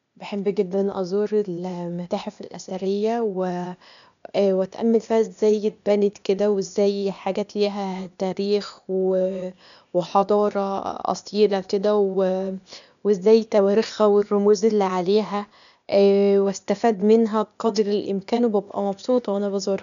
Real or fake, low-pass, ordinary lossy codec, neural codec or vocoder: fake; 7.2 kHz; none; codec, 16 kHz, 0.8 kbps, ZipCodec